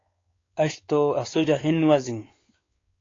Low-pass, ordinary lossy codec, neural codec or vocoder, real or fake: 7.2 kHz; AAC, 32 kbps; codec, 16 kHz, 4 kbps, X-Codec, WavLM features, trained on Multilingual LibriSpeech; fake